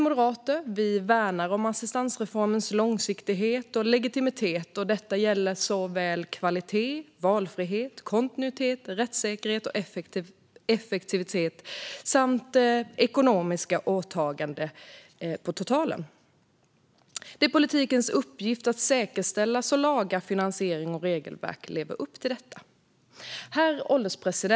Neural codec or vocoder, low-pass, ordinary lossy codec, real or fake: none; none; none; real